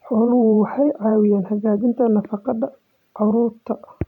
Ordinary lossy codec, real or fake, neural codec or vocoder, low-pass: none; fake; vocoder, 44.1 kHz, 128 mel bands every 512 samples, BigVGAN v2; 19.8 kHz